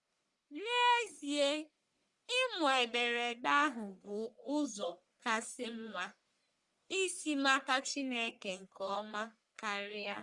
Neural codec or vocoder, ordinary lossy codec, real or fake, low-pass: codec, 44.1 kHz, 1.7 kbps, Pupu-Codec; Opus, 64 kbps; fake; 10.8 kHz